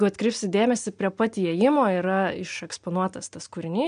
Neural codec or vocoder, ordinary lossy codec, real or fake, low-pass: none; MP3, 64 kbps; real; 9.9 kHz